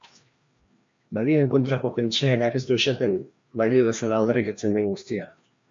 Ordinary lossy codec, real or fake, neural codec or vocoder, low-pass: MP3, 48 kbps; fake; codec, 16 kHz, 1 kbps, FreqCodec, larger model; 7.2 kHz